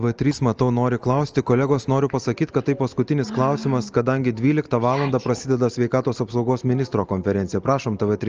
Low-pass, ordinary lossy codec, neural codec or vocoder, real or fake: 7.2 kHz; Opus, 24 kbps; none; real